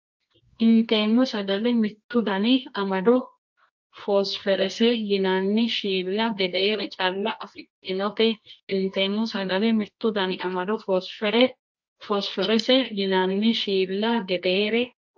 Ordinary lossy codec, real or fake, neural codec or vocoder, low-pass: MP3, 48 kbps; fake; codec, 24 kHz, 0.9 kbps, WavTokenizer, medium music audio release; 7.2 kHz